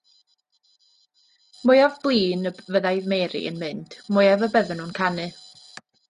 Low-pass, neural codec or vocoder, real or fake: 10.8 kHz; none; real